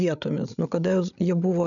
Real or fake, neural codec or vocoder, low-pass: fake; codec, 16 kHz, 8 kbps, FreqCodec, larger model; 7.2 kHz